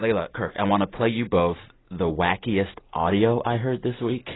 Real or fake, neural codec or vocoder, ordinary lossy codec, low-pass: real; none; AAC, 16 kbps; 7.2 kHz